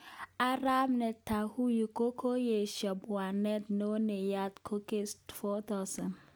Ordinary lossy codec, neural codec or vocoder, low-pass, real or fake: none; none; none; real